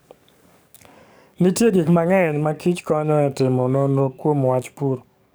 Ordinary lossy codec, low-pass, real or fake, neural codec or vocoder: none; none; fake; codec, 44.1 kHz, 7.8 kbps, DAC